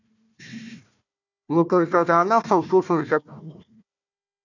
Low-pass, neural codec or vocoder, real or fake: 7.2 kHz; codec, 16 kHz, 1 kbps, FunCodec, trained on Chinese and English, 50 frames a second; fake